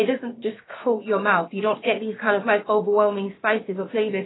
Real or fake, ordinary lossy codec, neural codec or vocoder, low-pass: fake; AAC, 16 kbps; codec, 16 kHz, about 1 kbps, DyCAST, with the encoder's durations; 7.2 kHz